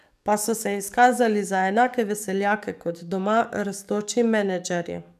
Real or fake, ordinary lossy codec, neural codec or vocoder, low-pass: fake; none; codec, 44.1 kHz, 7.8 kbps, DAC; 14.4 kHz